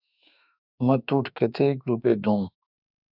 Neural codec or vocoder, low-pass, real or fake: autoencoder, 48 kHz, 32 numbers a frame, DAC-VAE, trained on Japanese speech; 5.4 kHz; fake